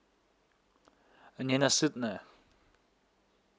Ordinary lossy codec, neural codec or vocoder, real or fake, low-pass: none; none; real; none